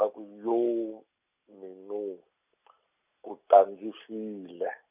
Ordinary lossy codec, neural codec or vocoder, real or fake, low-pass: none; none; real; 3.6 kHz